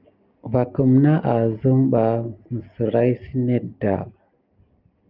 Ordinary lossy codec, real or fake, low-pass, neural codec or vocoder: Opus, 24 kbps; real; 5.4 kHz; none